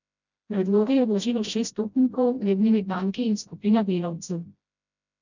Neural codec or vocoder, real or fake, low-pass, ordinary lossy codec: codec, 16 kHz, 0.5 kbps, FreqCodec, smaller model; fake; 7.2 kHz; none